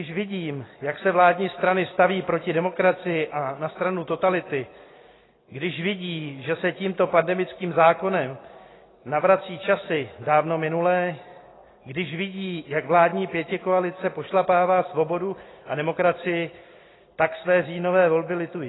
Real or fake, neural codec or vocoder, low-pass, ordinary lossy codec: real; none; 7.2 kHz; AAC, 16 kbps